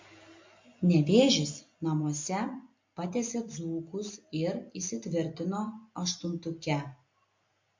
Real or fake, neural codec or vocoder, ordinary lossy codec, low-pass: real; none; MP3, 48 kbps; 7.2 kHz